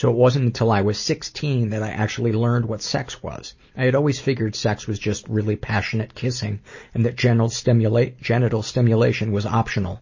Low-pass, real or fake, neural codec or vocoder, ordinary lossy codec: 7.2 kHz; real; none; MP3, 32 kbps